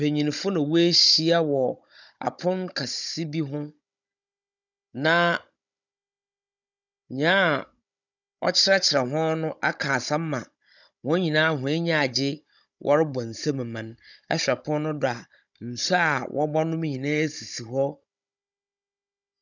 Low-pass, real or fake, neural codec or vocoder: 7.2 kHz; fake; codec, 16 kHz, 16 kbps, FunCodec, trained on Chinese and English, 50 frames a second